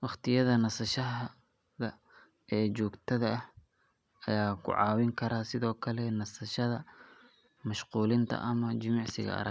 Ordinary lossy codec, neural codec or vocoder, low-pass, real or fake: none; none; none; real